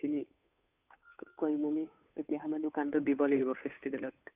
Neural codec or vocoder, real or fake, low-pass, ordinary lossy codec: codec, 16 kHz, 2 kbps, FunCodec, trained on Chinese and English, 25 frames a second; fake; 3.6 kHz; none